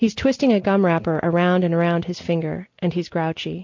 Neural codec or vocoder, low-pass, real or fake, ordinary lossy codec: none; 7.2 kHz; real; MP3, 64 kbps